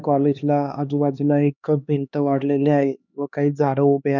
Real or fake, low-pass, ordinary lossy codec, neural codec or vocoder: fake; 7.2 kHz; none; codec, 16 kHz, 2 kbps, X-Codec, HuBERT features, trained on LibriSpeech